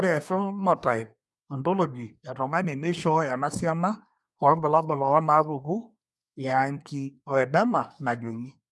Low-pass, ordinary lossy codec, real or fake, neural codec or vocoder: none; none; fake; codec, 24 kHz, 1 kbps, SNAC